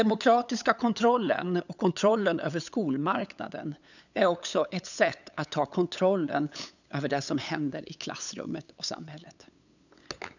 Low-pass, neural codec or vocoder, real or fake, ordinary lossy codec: 7.2 kHz; codec, 16 kHz, 8 kbps, FunCodec, trained on LibriTTS, 25 frames a second; fake; none